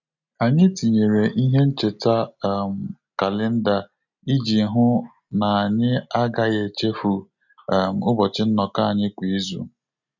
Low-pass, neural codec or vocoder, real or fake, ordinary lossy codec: 7.2 kHz; none; real; none